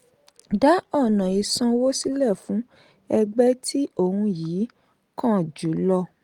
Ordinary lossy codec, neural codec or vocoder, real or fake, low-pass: Opus, 24 kbps; none; real; 19.8 kHz